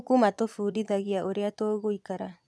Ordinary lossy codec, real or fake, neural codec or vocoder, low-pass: none; fake; vocoder, 22.05 kHz, 80 mel bands, Vocos; none